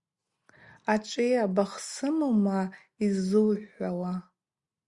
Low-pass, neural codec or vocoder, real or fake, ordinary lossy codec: 10.8 kHz; none; real; Opus, 64 kbps